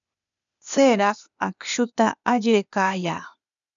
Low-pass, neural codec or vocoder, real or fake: 7.2 kHz; codec, 16 kHz, 0.8 kbps, ZipCodec; fake